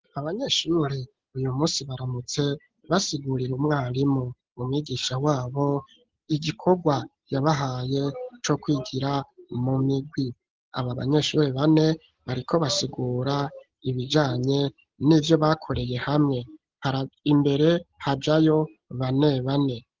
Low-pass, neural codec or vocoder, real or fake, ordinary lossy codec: 7.2 kHz; none; real; Opus, 16 kbps